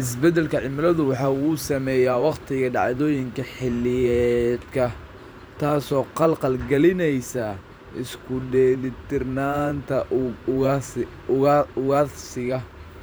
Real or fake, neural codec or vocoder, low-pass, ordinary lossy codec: fake; vocoder, 44.1 kHz, 128 mel bands every 512 samples, BigVGAN v2; none; none